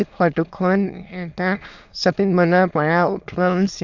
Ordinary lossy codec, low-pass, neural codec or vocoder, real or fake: Opus, 64 kbps; 7.2 kHz; autoencoder, 22.05 kHz, a latent of 192 numbers a frame, VITS, trained on many speakers; fake